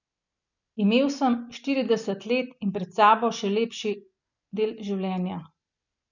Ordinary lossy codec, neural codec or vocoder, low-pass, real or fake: none; none; 7.2 kHz; real